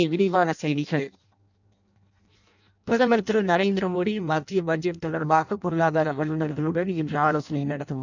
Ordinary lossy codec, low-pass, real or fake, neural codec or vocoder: none; 7.2 kHz; fake; codec, 16 kHz in and 24 kHz out, 0.6 kbps, FireRedTTS-2 codec